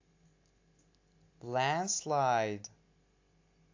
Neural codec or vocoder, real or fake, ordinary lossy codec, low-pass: none; real; AAC, 48 kbps; 7.2 kHz